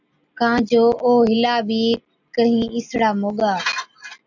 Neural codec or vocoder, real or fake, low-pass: none; real; 7.2 kHz